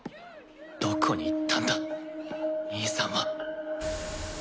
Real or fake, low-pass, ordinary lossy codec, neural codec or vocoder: real; none; none; none